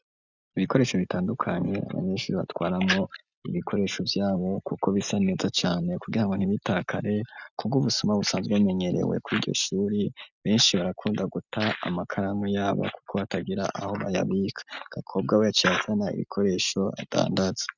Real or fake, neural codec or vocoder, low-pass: real; none; 7.2 kHz